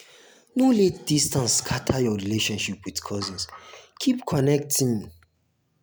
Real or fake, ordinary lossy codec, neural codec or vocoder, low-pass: real; none; none; none